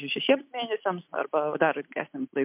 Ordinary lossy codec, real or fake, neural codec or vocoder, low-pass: MP3, 32 kbps; real; none; 3.6 kHz